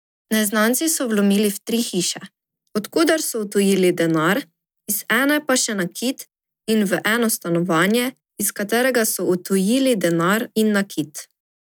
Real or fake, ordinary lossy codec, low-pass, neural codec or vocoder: real; none; none; none